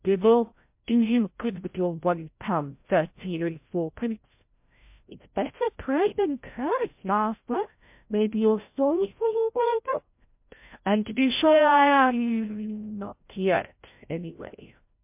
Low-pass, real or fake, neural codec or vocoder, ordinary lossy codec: 3.6 kHz; fake; codec, 16 kHz, 0.5 kbps, FreqCodec, larger model; MP3, 32 kbps